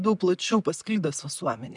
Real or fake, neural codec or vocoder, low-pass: real; none; 10.8 kHz